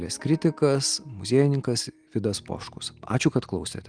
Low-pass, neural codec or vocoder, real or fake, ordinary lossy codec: 9.9 kHz; none; real; Opus, 24 kbps